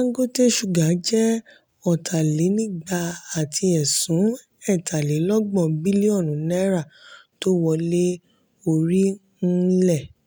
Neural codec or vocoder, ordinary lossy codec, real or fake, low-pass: none; none; real; none